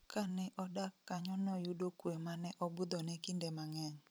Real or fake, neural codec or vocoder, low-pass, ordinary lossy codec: fake; vocoder, 44.1 kHz, 128 mel bands every 256 samples, BigVGAN v2; none; none